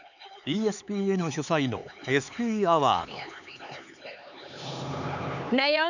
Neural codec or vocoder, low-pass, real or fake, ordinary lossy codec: codec, 16 kHz, 4 kbps, X-Codec, HuBERT features, trained on LibriSpeech; 7.2 kHz; fake; none